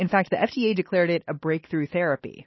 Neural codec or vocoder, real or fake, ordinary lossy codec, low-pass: none; real; MP3, 24 kbps; 7.2 kHz